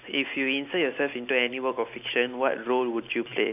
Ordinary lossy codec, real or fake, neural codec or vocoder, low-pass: none; real; none; 3.6 kHz